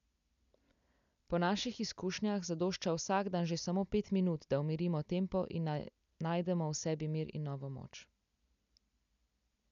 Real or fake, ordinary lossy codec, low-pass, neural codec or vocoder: real; none; 7.2 kHz; none